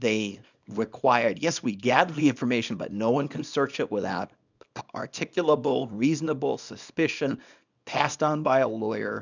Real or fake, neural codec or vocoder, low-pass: fake; codec, 24 kHz, 0.9 kbps, WavTokenizer, small release; 7.2 kHz